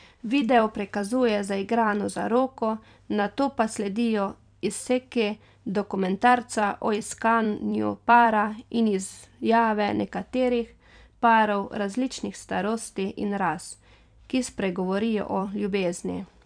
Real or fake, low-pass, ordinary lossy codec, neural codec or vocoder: fake; 9.9 kHz; none; vocoder, 44.1 kHz, 128 mel bands every 256 samples, BigVGAN v2